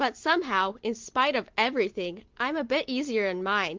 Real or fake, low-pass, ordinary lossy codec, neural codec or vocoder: real; 7.2 kHz; Opus, 16 kbps; none